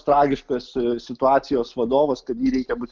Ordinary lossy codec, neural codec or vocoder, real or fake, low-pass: Opus, 32 kbps; none; real; 7.2 kHz